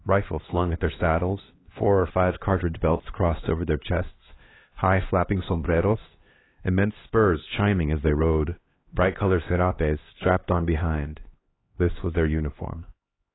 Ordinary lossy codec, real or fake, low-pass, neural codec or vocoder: AAC, 16 kbps; fake; 7.2 kHz; codec, 16 kHz, 2 kbps, X-Codec, HuBERT features, trained on LibriSpeech